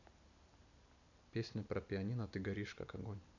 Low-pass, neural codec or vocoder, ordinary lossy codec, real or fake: 7.2 kHz; none; none; real